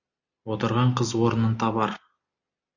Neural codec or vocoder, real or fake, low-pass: none; real; 7.2 kHz